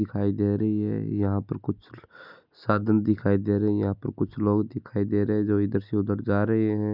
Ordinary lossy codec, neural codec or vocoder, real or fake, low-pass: none; none; real; 5.4 kHz